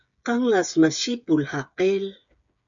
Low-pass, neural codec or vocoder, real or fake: 7.2 kHz; codec, 16 kHz, 8 kbps, FreqCodec, smaller model; fake